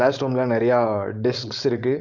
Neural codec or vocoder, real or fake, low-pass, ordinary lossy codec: codec, 16 kHz, 4.8 kbps, FACodec; fake; 7.2 kHz; none